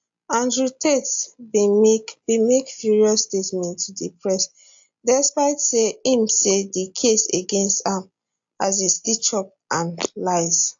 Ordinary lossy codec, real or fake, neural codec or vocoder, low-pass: AAC, 64 kbps; real; none; 7.2 kHz